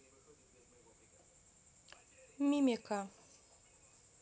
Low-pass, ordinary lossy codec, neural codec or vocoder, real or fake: none; none; none; real